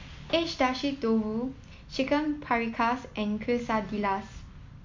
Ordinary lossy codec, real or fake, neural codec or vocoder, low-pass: MP3, 48 kbps; real; none; 7.2 kHz